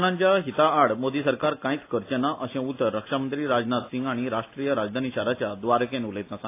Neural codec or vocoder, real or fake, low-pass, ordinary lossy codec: none; real; 3.6 kHz; AAC, 24 kbps